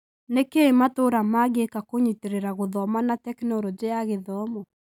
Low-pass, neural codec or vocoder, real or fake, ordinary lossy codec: 19.8 kHz; none; real; none